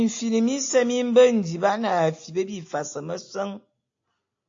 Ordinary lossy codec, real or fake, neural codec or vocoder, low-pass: AAC, 48 kbps; real; none; 7.2 kHz